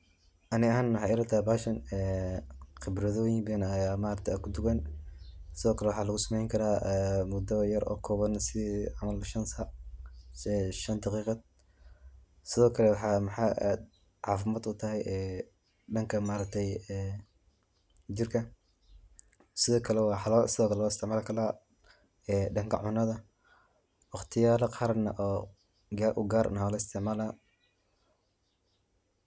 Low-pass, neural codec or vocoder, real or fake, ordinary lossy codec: none; none; real; none